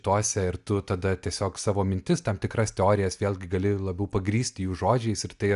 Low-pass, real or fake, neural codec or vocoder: 10.8 kHz; real; none